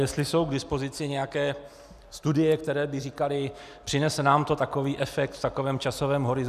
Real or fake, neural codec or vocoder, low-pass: real; none; 14.4 kHz